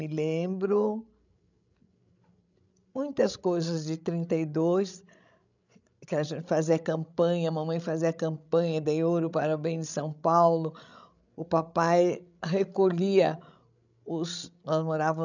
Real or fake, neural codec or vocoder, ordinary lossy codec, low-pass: fake; codec, 16 kHz, 16 kbps, FreqCodec, larger model; none; 7.2 kHz